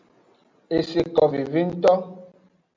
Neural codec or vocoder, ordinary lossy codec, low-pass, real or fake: none; MP3, 64 kbps; 7.2 kHz; real